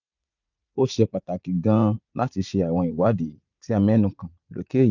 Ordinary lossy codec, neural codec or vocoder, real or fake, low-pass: none; vocoder, 44.1 kHz, 80 mel bands, Vocos; fake; 7.2 kHz